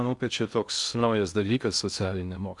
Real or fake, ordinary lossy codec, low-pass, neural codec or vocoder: fake; MP3, 96 kbps; 10.8 kHz; codec, 16 kHz in and 24 kHz out, 0.6 kbps, FocalCodec, streaming, 4096 codes